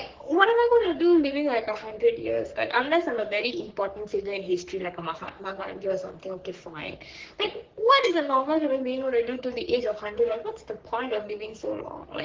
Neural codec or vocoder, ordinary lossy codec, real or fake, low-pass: codec, 44.1 kHz, 3.4 kbps, Pupu-Codec; Opus, 16 kbps; fake; 7.2 kHz